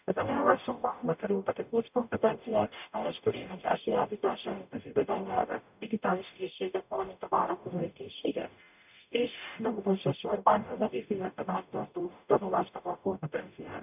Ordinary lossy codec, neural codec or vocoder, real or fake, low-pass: none; codec, 44.1 kHz, 0.9 kbps, DAC; fake; 3.6 kHz